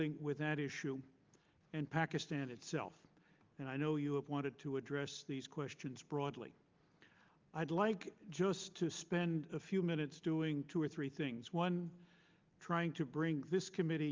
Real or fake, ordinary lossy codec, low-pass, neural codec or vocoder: real; Opus, 32 kbps; 7.2 kHz; none